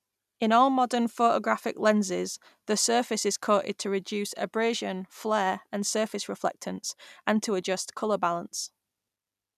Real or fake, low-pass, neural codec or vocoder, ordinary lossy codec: real; 14.4 kHz; none; none